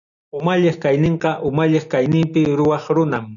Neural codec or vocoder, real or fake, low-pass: none; real; 7.2 kHz